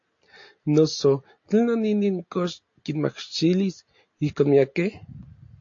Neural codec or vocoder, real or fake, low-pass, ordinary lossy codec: none; real; 7.2 kHz; AAC, 48 kbps